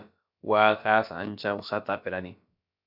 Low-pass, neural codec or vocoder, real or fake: 5.4 kHz; codec, 16 kHz, about 1 kbps, DyCAST, with the encoder's durations; fake